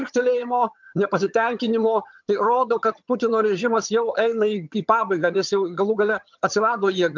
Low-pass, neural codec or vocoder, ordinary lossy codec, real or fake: 7.2 kHz; vocoder, 22.05 kHz, 80 mel bands, HiFi-GAN; MP3, 64 kbps; fake